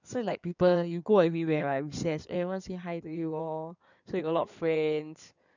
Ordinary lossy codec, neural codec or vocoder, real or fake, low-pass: none; codec, 16 kHz in and 24 kHz out, 2.2 kbps, FireRedTTS-2 codec; fake; 7.2 kHz